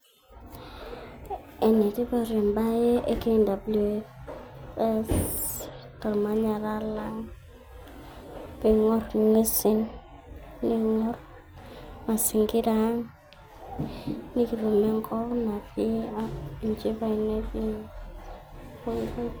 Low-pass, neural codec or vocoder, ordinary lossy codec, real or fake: none; none; none; real